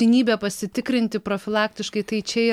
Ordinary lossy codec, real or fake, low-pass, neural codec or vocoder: MP3, 96 kbps; real; 19.8 kHz; none